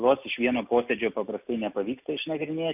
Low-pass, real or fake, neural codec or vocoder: 3.6 kHz; real; none